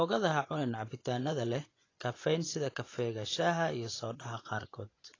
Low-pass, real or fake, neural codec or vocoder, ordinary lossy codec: 7.2 kHz; fake; vocoder, 24 kHz, 100 mel bands, Vocos; AAC, 32 kbps